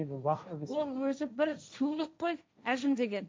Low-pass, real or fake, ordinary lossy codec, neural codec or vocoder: none; fake; none; codec, 16 kHz, 1.1 kbps, Voila-Tokenizer